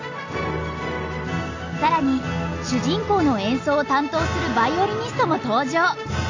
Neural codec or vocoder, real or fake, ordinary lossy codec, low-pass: none; real; AAC, 48 kbps; 7.2 kHz